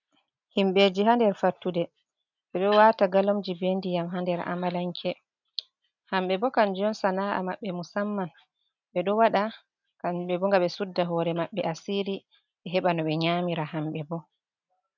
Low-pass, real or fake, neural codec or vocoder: 7.2 kHz; real; none